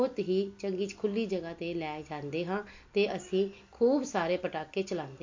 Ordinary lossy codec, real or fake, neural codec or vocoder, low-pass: MP3, 48 kbps; real; none; 7.2 kHz